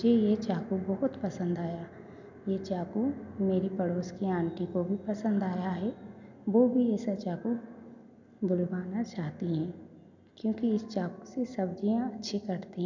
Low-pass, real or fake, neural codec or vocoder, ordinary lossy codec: 7.2 kHz; real; none; none